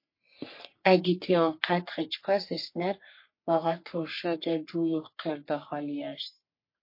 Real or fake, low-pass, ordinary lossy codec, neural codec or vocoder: fake; 5.4 kHz; MP3, 32 kbps; codec, 44.1 kHz, 3.4 kbps, Pupu-Codec